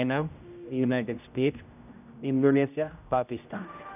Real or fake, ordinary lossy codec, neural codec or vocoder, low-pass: fake; none; codec, 16 kHz, 0.5 kbps, X-Codec, HuBERT features, trained on general audio; 3.6 kHz